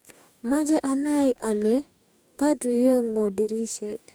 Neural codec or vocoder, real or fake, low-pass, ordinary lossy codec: codec, 44.1 kHz, 2.6 kbps, DAC; fake; none; none